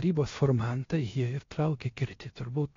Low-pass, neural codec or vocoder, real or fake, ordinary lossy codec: 7.2 kHz; codec, 16 kHz, 0.7 kbps, FocalCodec; fake; AAC, 32 kbps